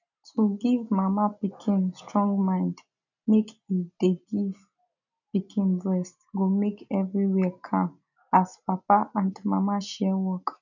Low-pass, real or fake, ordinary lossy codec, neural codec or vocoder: 7.2 kHz; real; none; none